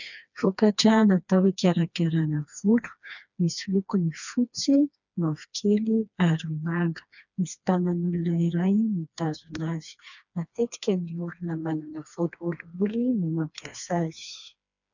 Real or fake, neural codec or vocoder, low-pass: fake; codec, 16 kHz, 2 kbps, FreqCodec, smaller model; 7.2 kHz